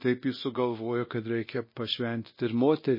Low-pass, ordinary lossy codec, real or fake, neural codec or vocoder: 5.4 kHz; MP3, 24 kbps; fake; codec, 24 kHz, 0.9 kbps, DualCodec